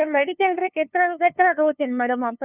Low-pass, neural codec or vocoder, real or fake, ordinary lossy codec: 3.6 kHz; codec, 16 kHz, 2 kbps, FunCodec, trained on LibriTTS, 25 frames a second; fake; Opus, 64 kbps